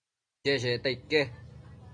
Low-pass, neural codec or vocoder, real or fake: 9.9 kHz; none; real